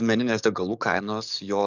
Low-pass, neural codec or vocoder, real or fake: 7.2 kHz; codec, 44.1 kHz, 7.8 kbps, DAC; fake